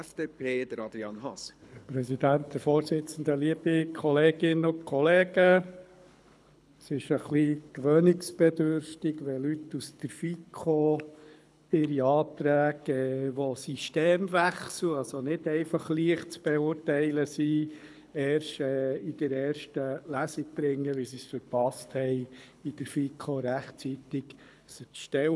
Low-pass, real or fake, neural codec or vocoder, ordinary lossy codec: none; fake; codec, 24 kHz, 6 kbps, HILCodec; none